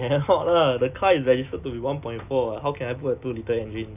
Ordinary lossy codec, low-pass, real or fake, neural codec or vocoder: none; 3.6 kHz; real; none